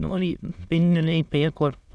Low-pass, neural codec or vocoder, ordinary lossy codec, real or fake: none; autoencoder, 22.05 kHz, a latent of 192 numbers a frame, VITS, trained on many speakers; none; fake